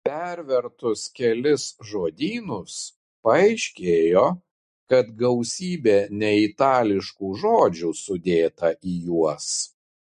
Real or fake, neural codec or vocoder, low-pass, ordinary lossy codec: real; none; 14.4 kHz; MP3, 48 kbps